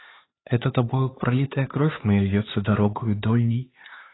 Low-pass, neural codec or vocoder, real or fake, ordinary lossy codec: 7.2 kHz; codec, 16 kHz, 4 kbps, X-Codec, HuBERT features, trained on LibriSpeech; fake; AAC, 16 kbps